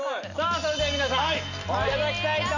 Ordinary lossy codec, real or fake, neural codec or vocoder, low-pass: none; real; none; 7.2 kHz